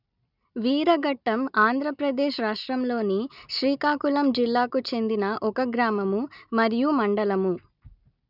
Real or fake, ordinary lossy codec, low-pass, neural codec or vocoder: real; none; 5.4 kHz; none